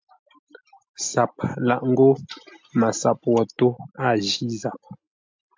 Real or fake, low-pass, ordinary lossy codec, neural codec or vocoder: real; 7.2 kHz; MP3, 64 kbps; none